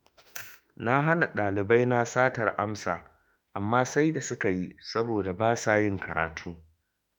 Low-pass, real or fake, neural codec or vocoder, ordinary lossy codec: none; fake; autoencoder, 48 kHz, 32 numbers a frame, DAC-VAE, trained on Japanese speech; none